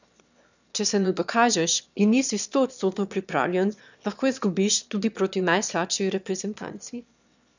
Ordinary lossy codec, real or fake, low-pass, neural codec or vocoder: none; fake; 7.2 kHz; autoencoder, 22.05 kHz, a latent of 192 numbers a frame, VITS, trained on one speaker